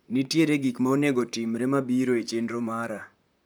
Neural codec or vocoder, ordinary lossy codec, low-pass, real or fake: vocoder, 44.1 kHz, 128 mel bands, Pupu-Vocoder; none; none; fake